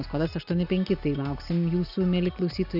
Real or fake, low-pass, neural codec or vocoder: real; 5.4 kHz; none